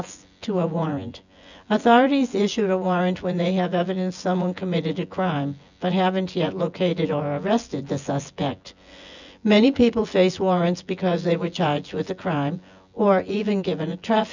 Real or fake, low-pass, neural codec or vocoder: fake; 7.2 kHz; vocoder, 24 kHz, 100 mel bands, Vocos